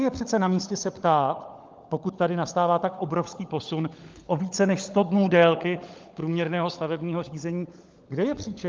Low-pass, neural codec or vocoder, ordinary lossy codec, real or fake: 7.2 kHz; codec, 16 kHz, 16 kbps, FunCodec, trained on LibriTTS, 50 frames a second; Opus, 24 kbps; fake